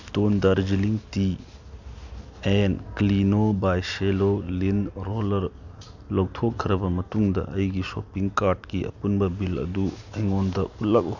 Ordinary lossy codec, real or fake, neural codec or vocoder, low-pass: none; real; none; 7.2 kHz